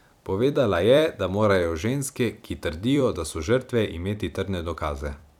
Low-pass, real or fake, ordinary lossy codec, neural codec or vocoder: 19.8 kHz; fake; none; vocoder, 44.1 kHz, 128 mel bands every 256 samples, BigVGAN v2